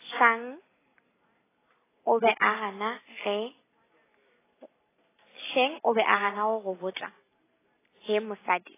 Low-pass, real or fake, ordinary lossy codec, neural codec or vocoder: 3.6 kHz; real; AAC, 16 kbps; none